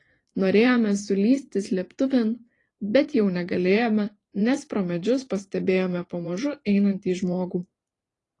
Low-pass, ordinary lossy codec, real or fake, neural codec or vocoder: 10.8 kHz; AAC, 32 kbps; fake; vocoder, 44.1 kHz, 128 mel bands every 512 samples, BigVGAN v2